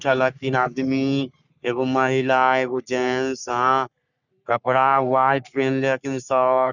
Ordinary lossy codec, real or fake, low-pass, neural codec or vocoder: none; fake; 7.2 kHz; codec, 44.1 kHz, 3.4 kbps, Pupu-Codec